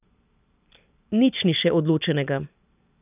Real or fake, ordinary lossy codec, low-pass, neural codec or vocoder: real; none; 3.6 kHz; none